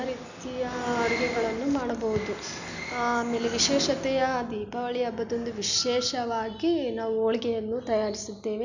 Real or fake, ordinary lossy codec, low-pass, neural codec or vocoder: real; none; 7.2 kHz; none